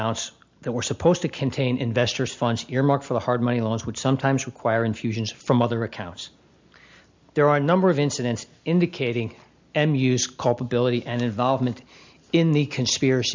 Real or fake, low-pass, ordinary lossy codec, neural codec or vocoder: real; 7.2 kHz; MP3, 64 kbps; none